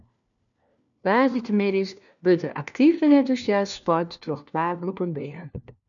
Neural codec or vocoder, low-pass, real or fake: codec, 16 kHz, 1 kbps, FunCodec, trained on LibriTTS, 50 frames a second; 7.2 kHz; fake